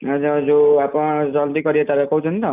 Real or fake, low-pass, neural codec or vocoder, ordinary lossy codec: real; 3.6 kHz; none; none